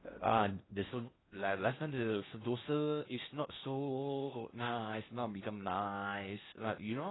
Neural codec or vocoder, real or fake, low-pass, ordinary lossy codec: codec, 16 kHz in and 24 kHz out, 0.6 kbps, FocalCodec, streaming, 4096 codes; fake; 7.2 kHz; AAC, 16 kbps